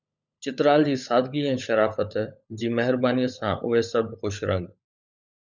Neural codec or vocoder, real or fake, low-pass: codec, 16 kHz, 16 kbps, FunCodec, trained on LibriTTS, 50 frames a second; fake; 7.2 kHz